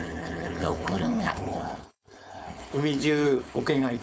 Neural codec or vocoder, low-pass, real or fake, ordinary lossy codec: codec, 16 kHz, 4.8 kbps, FACodec; none; fake; none